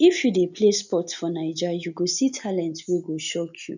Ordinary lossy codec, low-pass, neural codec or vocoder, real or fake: none; 7.2 kHz; none; real